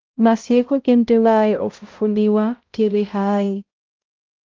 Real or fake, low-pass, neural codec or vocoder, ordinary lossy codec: fake; 7.2 kHz; codec, 16 kHz, 0.5 kbps, X-Codec, HuBERT features, trained on balanced general audio; Opus, 32 kbps